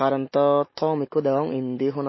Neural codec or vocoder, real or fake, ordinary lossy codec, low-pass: none; real; MP3, 24 kbps; 7.2 kHz